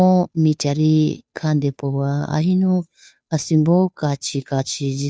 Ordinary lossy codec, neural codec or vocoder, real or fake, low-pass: none; codec, 16 kHz, 2 kbps, FunCodec, trained on Chinese and English, 25 frames a second; fake; none